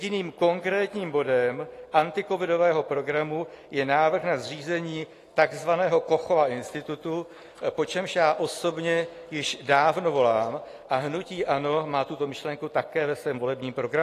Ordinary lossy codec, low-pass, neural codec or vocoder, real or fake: AAC, 48 kbps; 14.4 kHz; none; real